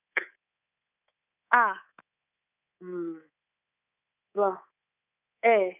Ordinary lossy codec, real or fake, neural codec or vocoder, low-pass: none; fake; codec, 24 kHz, 3.1 kbps, DualCodec; 3.6 kHz